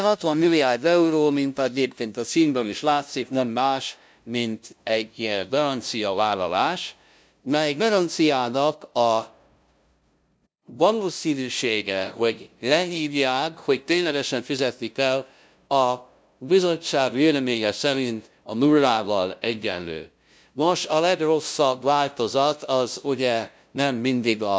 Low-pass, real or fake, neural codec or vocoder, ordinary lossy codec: none; fake; codec, 16 kHz, 0.5 kbps, FunCodec, trained on LibriTTS, 25 frames a second; none